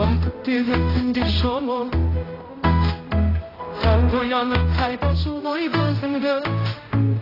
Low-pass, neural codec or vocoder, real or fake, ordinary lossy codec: 5.4 kHz; codec, 16 kHz, 0.5 kbps, X-Codec, HuBERT features, trained on general audio; fake; AAC, 24 kbps